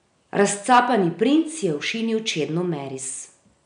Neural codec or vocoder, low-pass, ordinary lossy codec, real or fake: none; 9.9 kHz; none; real